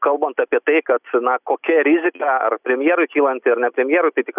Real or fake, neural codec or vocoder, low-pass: real; none; 3.6 kHz